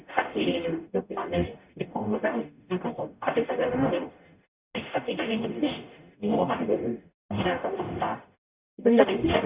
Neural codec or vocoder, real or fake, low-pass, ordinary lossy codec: codec, 44.1 kHz, 0.9 kbps, DAC; fake; 3.6 kHz; Opus, 64 kbps